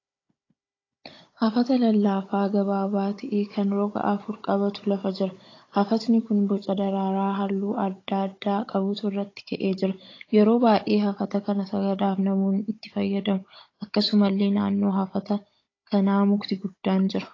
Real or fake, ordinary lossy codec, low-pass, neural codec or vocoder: fake; AAC, 32 kbps; 7.2 kHz; codec, 16 kHz, 16 kbps, FunCodec, trained on Chinese and English, 50 frames a second